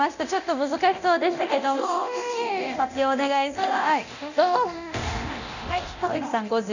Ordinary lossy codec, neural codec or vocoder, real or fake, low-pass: none; codec, 24 kHz, 0.9 kbps, DualCodec; fake; 7.2 kHz